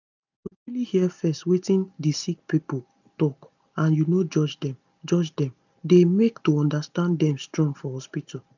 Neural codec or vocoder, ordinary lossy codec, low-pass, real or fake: none; none; 7.2 kHz; real